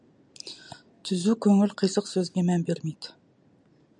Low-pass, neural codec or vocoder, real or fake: 10.8 kHz; none; real